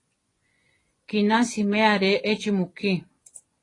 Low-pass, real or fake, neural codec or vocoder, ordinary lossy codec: 10.8 kHz; fake; vocoder, 24 kHz, 100 mel bands, Vocos; AAC, 32 kbps